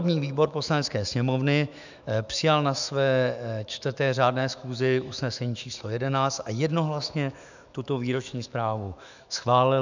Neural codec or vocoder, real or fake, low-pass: autoencoder, 48 kHz, 128 numbers a frame, DAC-VAE, trained on Japanese speech; fake; 7.2 kHz